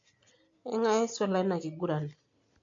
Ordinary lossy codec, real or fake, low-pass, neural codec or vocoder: AAC, 48 kbps; real; 7.2 kHz; none